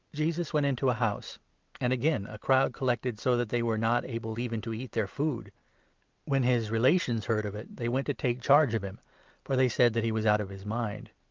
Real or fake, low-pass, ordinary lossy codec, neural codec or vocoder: real; 7.2 kHz; Opus, 24 kbps; none